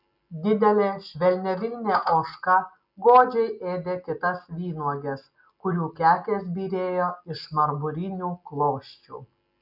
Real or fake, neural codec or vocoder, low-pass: real; none; 5.4 kHz